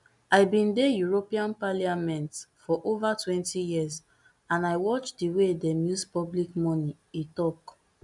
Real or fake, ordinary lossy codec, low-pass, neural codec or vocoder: real; none; 10.8 kHz; none